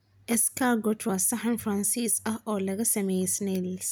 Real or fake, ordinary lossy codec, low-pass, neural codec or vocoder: real; none; none; none